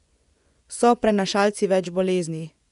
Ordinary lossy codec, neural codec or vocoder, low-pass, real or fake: none; vocoder, 24 kHz, 100 mel bands, Vocos; 10.8 kHz; fake